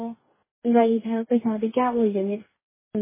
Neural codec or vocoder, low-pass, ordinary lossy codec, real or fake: codec, 44.1 kHz, 2.6 kbps, DAC; 3.6 kHz; MP3, 16 kbps; fake